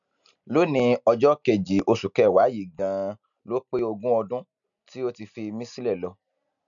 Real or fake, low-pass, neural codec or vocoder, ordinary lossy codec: real; 7.2 kHz; none; none